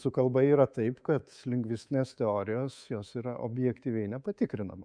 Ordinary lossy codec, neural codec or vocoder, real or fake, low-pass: MP3, 96 kbps; codec, 24 kHz, 3.1 kbps, DualCodec; fake; 9.9 kHz